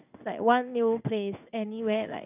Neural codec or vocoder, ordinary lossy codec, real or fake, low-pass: codec, 16 kHz in and 24 kHz out, 1 kbps, XY-Tokenizer; none; fake; 3.6 kHz